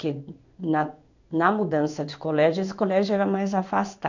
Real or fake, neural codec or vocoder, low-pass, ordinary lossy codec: fake; codec, 16 kHz in and 24 kHz out, 1 kbps, XY-Tokenizer; 7.2 kHz; none